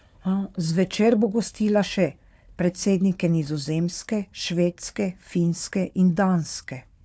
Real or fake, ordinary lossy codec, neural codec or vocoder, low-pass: fake; none; codec, 16 kHz, 4 kbps, FunCodec, trained on Chinese and English, 50 frames a second; none